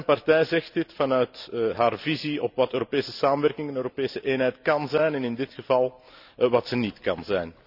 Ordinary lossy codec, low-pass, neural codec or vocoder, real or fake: none; 5.4 kHz; none; real